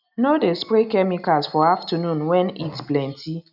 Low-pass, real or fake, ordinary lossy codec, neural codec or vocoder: 5.4 kHz; real; none; none